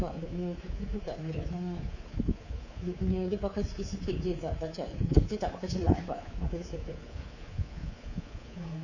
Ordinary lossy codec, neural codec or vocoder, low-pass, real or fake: none; codec, 24 kHz, 3.1 kbps, DualCodec; 7.2 kHz; fake